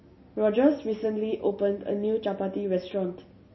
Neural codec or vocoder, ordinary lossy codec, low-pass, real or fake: none; MP3, 24 kbps; 7.2 kHz; real